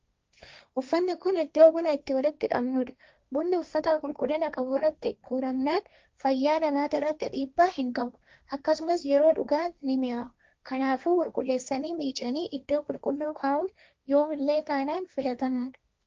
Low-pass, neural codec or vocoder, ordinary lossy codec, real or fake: 7.2 kHz; codec, 16 kHz, 1.1 kbps, Voila-Tokenizer; Opus, 24 kbps; fake